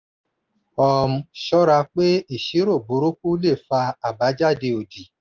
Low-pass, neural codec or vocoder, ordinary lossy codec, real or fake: 7.2 kHz; none; Opus, 24 kbps; real